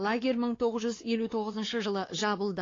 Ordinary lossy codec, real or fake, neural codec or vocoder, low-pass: AAC, 32 kbps; fake; codec, 16 kHz, 2 kbps, X-Codec, WavLM features, trained on Multilingual LibriSpeech; 7.2 kHz